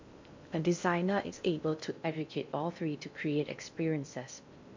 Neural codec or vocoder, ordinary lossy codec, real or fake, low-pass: codec, 16 kHz in and 24 kHz out, 0.6 kbps, FocalCodec, streaming, 2048 codes; MP3, 64 kbps; fake; 7.2 kHz